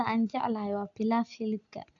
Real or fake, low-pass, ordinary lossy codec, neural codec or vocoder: real; 7.2 kHz; none; none